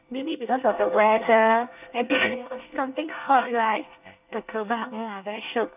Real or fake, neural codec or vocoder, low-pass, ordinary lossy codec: fake; codec, 24 kHz, 1 kbps, SNAC; 3.6 kHz; none